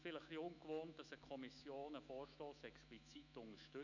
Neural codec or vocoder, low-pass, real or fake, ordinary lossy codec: autoencoder, 48 kHz, 128 numbers a frame, DAC-VAE, trained on Japanese speech; 7.2 kHz; fake; none